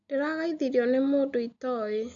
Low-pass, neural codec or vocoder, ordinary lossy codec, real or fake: 7.2 kHz; none; AAC, 64 kbps; real